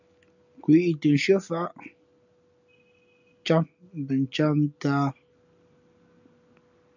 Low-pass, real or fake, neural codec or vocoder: 7.2 kHz; real; none